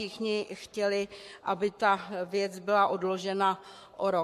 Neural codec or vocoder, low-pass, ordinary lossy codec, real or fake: codec, 44.1 kHz, 7.8 kbps, Pupu-Codec; 14.4 kHz; MP3, 64 kbps; fake